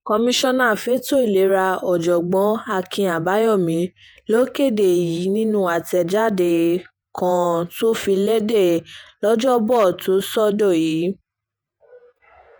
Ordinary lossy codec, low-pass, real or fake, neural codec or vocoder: none; none; fake; vocoder, 48 kHz, 128 mel bands, Vocos